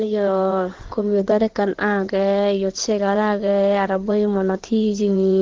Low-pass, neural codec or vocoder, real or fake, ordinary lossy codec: 7.2 kHz; codec, 16 kHz in and 24 kHz out, 2.2 kbps, FireRedTTS-2 codec; fake; Opus, 16 kbps